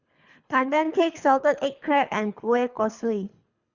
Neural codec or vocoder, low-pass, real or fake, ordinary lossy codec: codec, 24 kHz, 3 kbps, HILCodec; 7.2 kHz; fake; Opus, 64 kbps